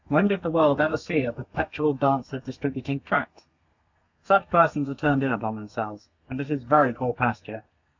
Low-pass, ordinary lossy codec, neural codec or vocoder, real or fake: 7.2 kHz; MP3, 64 kbps; codec, 32 kHz, 1.9 kbps, SNAC; fake